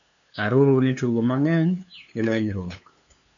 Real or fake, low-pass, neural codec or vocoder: fake; 7.2 kHz; codec, 16 kHz, 2 kbps, FunCodec, trained on LibriTTS, 25 frames a second